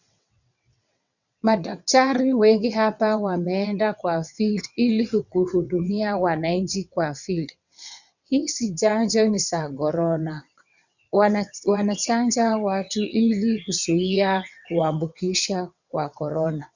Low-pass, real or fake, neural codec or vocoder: 7.2 kHz; fake; vocoder, 22.05 kHz, 80 mel bands, WaveNeXt